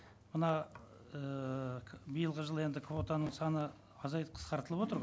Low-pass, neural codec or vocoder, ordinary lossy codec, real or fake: none; none; none; real